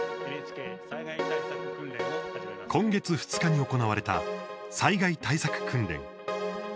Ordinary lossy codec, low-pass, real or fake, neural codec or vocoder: none; none; real; none